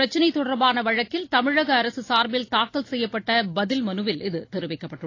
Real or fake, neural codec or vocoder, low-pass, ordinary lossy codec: real; none; 7.2 kHz; AAC, 32 kbps